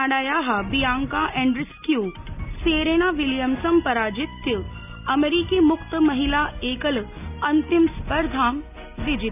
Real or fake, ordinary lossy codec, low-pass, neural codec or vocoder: real; none; 3.6 kHz; none